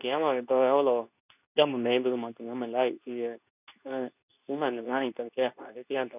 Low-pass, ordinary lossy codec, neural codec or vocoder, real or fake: 3.6 kHz; none; codec, 24 kHz, 0.9 kbps, WavTokenizer, medium speech release version 2; fake